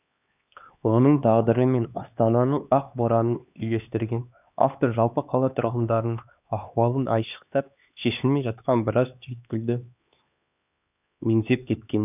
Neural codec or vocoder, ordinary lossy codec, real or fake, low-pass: codec, 16 kHz, 2 kbps, X-Codec, HuBERT features, trained on LibriSpeech; none; fake; 3.6 kHz